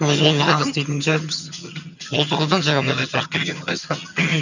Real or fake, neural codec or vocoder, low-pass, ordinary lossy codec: fake; vocoder, 22.05 kHz, 80 mel bands, HiFi-GAN; 7.2 kHz; none